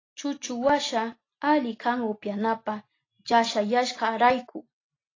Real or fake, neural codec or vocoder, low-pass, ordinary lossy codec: real; none; 7.2 kHz; AAC, 32 kbps